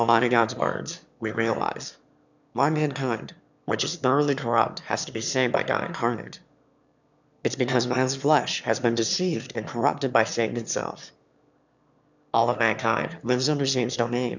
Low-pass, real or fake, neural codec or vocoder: 7.2 kHz; fake; autoencoder, 22.05 kHz, a latent of 192 numbers a frame, VITS, trained on one speaker